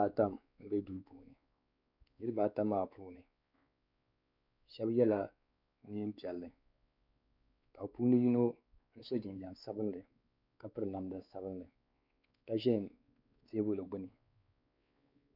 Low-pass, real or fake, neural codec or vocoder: 5.4 kHz; fake; codec, 16 kHz, 4 kbps, X-Codec, WavLM features, trained on Multilingual LibriSpeech